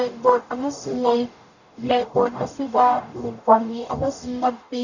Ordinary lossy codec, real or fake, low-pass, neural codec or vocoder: none; fake; 7.2 kHz; codec, 44.1 kHz, 0.9 kbps, DAC